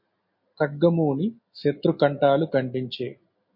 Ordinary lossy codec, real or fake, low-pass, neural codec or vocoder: MP3, 32 kbps; real; 5.4 kHz; none